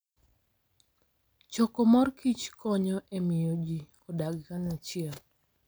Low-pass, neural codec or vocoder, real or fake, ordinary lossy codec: none; none; real; none